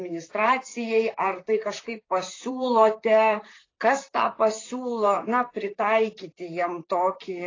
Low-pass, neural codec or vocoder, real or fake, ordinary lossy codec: 7.2 kHz; vocoder, 44.1 kHz, 128 mel bands, Pupu-Vocoder; fake; AAC, 32 kbps